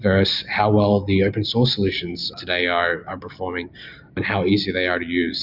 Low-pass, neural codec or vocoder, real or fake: 5.4 kHz; none; real